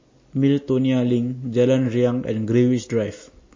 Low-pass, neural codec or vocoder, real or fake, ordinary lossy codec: 7.2 kHz; none; real; MP3, 32 kbps